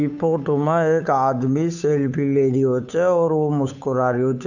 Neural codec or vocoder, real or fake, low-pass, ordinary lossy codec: codec, 16 kHz, 8 kbps, FunCodec, trained on Chinese and English, 25 frames a second; fake; 7.2 kHz; none